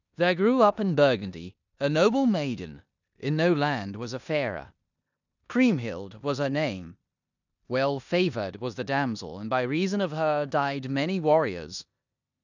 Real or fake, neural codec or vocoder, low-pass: fake; codec, 16 kHz in and 24 kHz out, 0.9 kbps, LongCat-Audio-Codec, four codebook decoder; 7.2 kHz